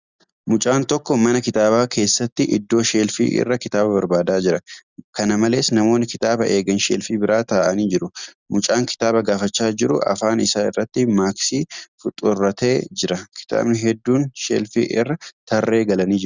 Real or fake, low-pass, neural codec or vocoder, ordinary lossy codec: real; 7.2 kHz; none; Opus, 64 kbps